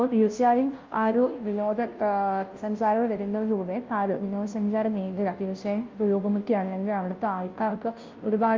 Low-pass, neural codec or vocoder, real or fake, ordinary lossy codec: 7.2 kHz; codec, 16 kHz, 0.5 kbps, FunCodec, trained on Chinese and English, 25 frames a second; fake; Opus, 24 kbps